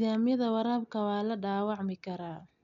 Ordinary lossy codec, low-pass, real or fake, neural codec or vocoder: none; 7.2 kHz; real; none